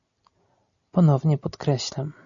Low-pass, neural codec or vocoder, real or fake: 7.2 kHz; none; real